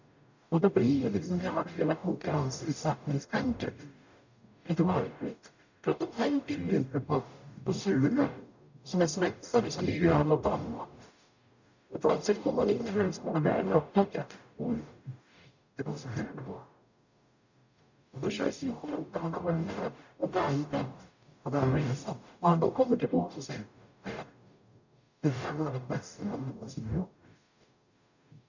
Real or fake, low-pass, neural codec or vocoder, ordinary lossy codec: fake; 7.2 kHz; codec, 44.1 kHz, 0.9 kbps, DAC; none